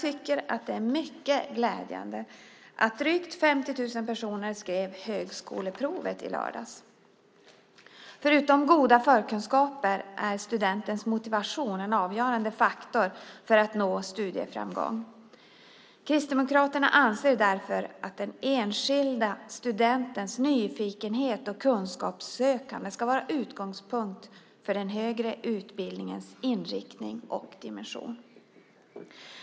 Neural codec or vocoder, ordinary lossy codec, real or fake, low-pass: none; none; real; none